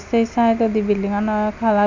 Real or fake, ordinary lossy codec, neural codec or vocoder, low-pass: real; MP3, 64 kbps; none; 7.2 kHz